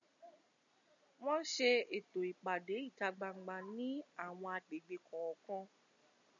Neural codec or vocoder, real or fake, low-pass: none; real; 7.2 kHz